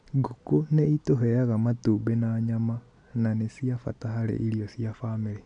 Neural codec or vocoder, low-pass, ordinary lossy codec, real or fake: none; 9.9 kHz; AAC, 64 kbps; real